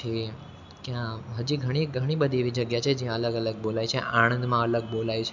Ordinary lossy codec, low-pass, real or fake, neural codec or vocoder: none; 7.2 kHz; real; none